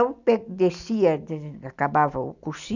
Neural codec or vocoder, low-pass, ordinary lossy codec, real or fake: none; 7.2 kHz; none; real